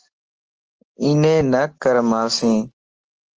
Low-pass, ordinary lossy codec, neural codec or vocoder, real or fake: 7.2 kHz; Opus, 16 kbps; none; real